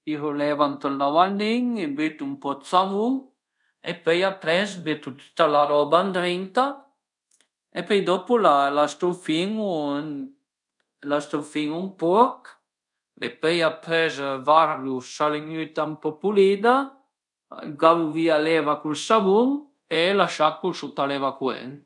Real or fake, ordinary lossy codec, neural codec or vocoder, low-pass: fake; none; codec, 24 kHz, 0.5 kbps, DualCodec; 10.8 kHz